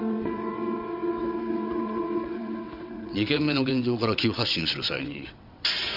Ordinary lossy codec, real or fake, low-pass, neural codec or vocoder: AAC, 48 kbps; fake; 5.4 kHz; vocoder, 22.05 kHz, 80 mel bands, WaveNeXt